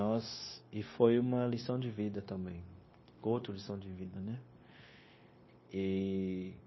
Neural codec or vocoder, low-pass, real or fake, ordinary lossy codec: codec, 16 kHz, 0.9 kbps, LongCat-Audio-Codec; 7.2 kHz; fake; MP3, 24 kbps